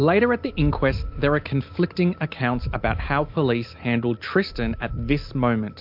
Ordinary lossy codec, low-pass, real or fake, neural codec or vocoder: MP3, 48 kbps; 5.4 kHz; real; none